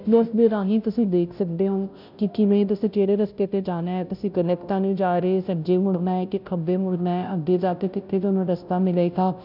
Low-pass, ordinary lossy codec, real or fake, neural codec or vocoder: 5.4 kHz; none; fake; codec, 16 kHz, 0.5 kbps, FunCodec, trained on Chinese and English, 25 frames a second